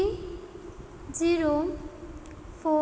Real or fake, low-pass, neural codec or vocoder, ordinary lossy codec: real; none; none; none